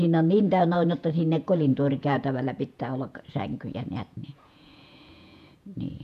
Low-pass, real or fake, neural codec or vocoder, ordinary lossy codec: 14.4 kHz; fake; vocoder, 48 kHz, 128 mel bands, Vocos; MP3, 96 kbps